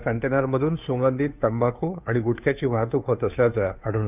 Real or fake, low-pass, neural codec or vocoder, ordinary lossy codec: fake; 3.6 kHz; codec, 16 kHz, 2 kbps, FunCodec, trained on Chinese and English, 25 frames a second; none